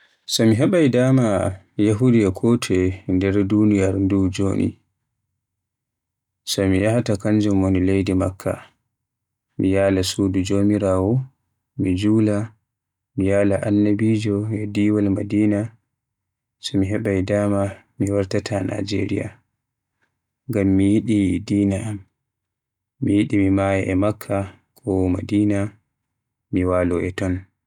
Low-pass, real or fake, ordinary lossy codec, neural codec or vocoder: 19.8 kHz; real; none; none